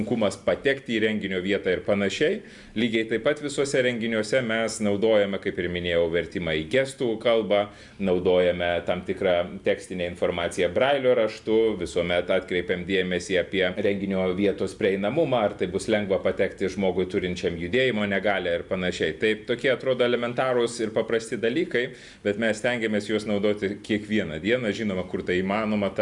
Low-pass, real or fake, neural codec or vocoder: 10.8 kHz; real; none